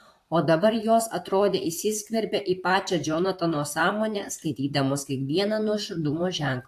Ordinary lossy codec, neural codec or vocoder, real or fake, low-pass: AAC, 64 kbps; vocoder, 44.1 kHz, 128 mel bands, Pupu-Vocoder; fake; 14.4 kHz